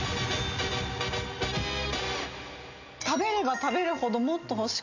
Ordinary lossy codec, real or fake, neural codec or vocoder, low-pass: none; real; none; 7.2 kHz